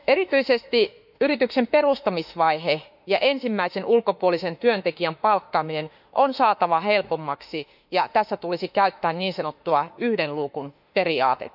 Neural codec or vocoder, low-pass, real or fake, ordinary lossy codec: autoencoder, 48 kHz, 32 numbers a frame, DAC-VAE, trained on Japanese speech; 5.4 kHz; fake; none